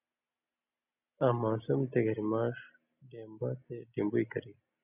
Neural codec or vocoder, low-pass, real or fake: none; 3.6 kHz; real